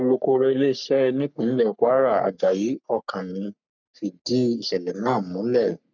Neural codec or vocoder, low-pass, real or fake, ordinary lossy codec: codec, 44.1 kHz, 3.4 kbps, Pupu-Codec; 7.2 kHz; fake; none